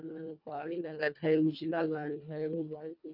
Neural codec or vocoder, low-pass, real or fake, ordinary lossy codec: codec, 24 kHz, 1.5 kbps, HILCodec; 5.4 kHz; fake; none